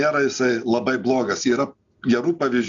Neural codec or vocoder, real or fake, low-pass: none; real; 7.2 kHz